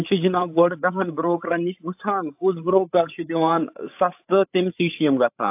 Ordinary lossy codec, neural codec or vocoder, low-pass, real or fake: AAC, 32 kbps; codec, 16 kHz, 8 kbps, FreqCodec, larger model; 3.6 kHz; fake